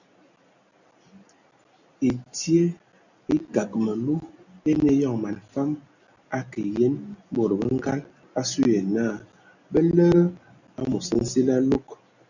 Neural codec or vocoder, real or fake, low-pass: none; real; 7.2 kHz